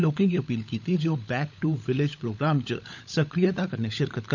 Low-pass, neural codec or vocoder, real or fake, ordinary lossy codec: 7.2 kHz; codec, 16 kHz, 16 kbps, FunCodec, trained on LibriTTS, 50 frames a second; fake; none